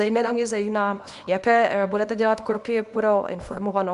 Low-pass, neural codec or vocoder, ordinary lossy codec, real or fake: 10.8 kHz; codec, 24 kHz, 0.9 kbps, WavTokenizer, small release; Opus, 64 kbps; fake